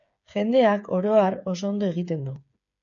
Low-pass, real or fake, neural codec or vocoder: 7.2 kHz; fake; codec, 16 kHz, 8 kbps, FreqCodec, smaller model